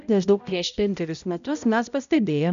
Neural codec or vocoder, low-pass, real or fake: codec, 16 kHz, 0.5 kbps, X-Codec, HuBERT features, trained on balanced general audio; 7.2 kHz; fake